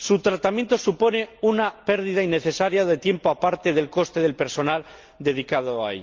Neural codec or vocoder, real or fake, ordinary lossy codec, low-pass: none; real; Opus, 32 kbps; 7.2 kHz